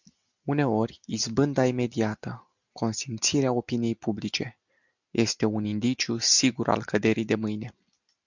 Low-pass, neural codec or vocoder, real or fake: 7.2 kHz; none; real